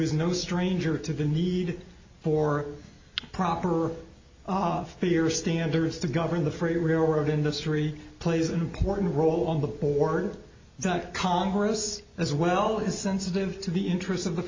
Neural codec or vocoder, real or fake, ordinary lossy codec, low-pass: none; real; MP3, 32 kbps; 7.2 kHz